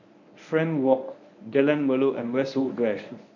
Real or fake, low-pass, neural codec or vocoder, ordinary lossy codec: fake; 7.2 kHz; codec, 24 kHz, 0.9 kbps, WavTokenizer, medium speech release version 1; none